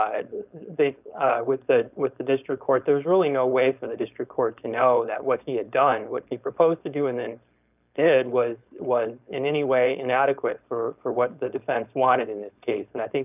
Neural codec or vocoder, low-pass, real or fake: codec, 16 kHz, 4.8 kbps, FACodec; 3.6 kHz; fake